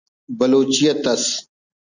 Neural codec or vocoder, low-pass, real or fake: none; 7.2 kHz; real